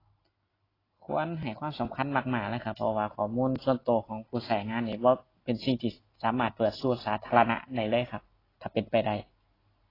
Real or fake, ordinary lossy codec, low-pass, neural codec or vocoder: real; AAC, 24 kbps; 5.4 kHz; none